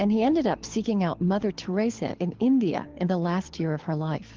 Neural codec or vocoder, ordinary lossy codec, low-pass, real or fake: codec, 24 kHz, 6 kbps, HILCodec; Opus, 16 kbps; 7.2 kHz; fake